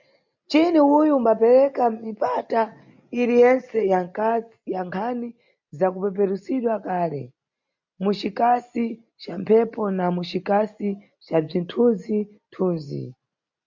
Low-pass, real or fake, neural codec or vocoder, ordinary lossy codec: 7.2 kHz; real; none; MP3, 64 kbps